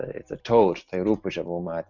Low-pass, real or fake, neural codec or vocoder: 7.2 kHz; real; none